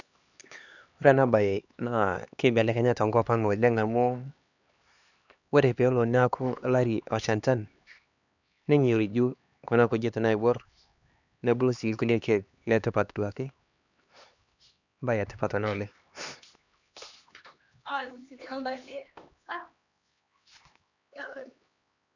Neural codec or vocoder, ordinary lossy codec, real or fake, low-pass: codec, 16 kHz, 2 kbps, X-Codec, HuBERT features, trained on LibriSpeech; none; fake; 7.2 kHz